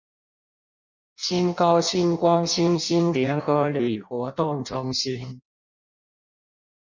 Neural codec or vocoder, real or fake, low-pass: codec, 16 kHz in and 24 kHz out, 0.6 kbps, FireRedTTS-2 codec; fake; 7.2 kHz